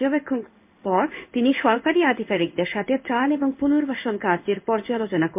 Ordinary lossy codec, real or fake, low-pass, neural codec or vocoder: MP3, 32 kbps; fake; 3.6 kHz; codec, 16 kHz in and 24 kHz out, 1 kbps, XY-Tokenizer